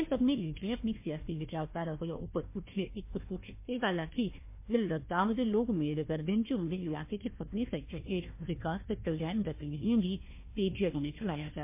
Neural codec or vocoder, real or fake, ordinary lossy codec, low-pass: codec, 16 kHz, 1 kbps, FunCodec, trained on Chinese and English, 50 frames a second; fake; MP3, 24 kbps; 3.6 kHz